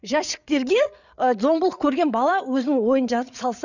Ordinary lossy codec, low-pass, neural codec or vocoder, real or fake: none; 7.2 kHz; codec, 16 kHz, 8 kbps, FreqCodec, larger model; fake